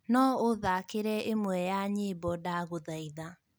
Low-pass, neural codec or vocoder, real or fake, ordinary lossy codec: none; none; real; none